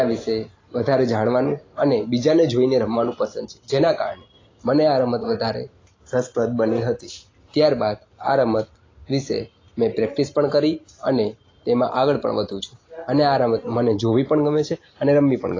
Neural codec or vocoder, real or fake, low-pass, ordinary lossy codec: none; real; 7.2 kHz; AAC, 32 kbps